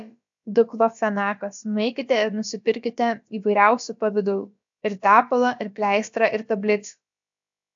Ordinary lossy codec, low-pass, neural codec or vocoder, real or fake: AAC, 64 kbps; 7.2 kHz; codec, 16 kHz, about 1 kbps, DyCAST, with the encoder's durations; fake